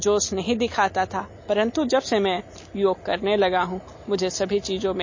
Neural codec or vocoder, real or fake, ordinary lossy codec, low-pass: none; real; MP3, 32 kbps; 7.2 kHz